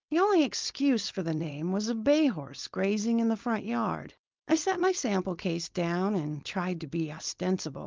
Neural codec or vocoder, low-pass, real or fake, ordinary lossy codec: codec, 16 kHz, 4.8 kbps, FACodec; 7.2 kHz; fake; Opus, 32 kbps